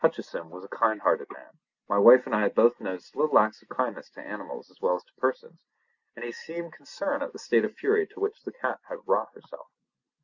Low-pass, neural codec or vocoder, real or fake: 7.2 kHz; none; real